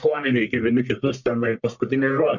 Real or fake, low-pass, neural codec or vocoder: fake; 7.2 kHz; codec, 44.1 kHz, 1.7 kbps, Pupu-Codec